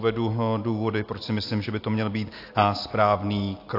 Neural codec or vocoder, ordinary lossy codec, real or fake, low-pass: none; AAC, 32 kbps; real; 5.4 kHz